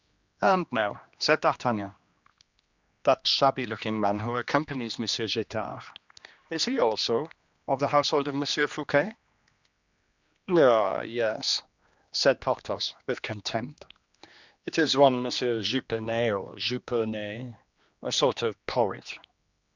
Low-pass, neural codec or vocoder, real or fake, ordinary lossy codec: 7.2 kHz; codec, 16 kHz, 2 kbps, X-Codec, HuBERT features, trained on general audio; fake; Opus, 64 kbps